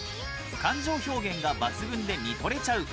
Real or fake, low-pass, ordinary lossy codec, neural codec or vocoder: real; none; none; none